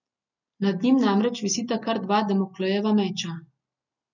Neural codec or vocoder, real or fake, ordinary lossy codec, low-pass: none; real; none; 7.2 kHz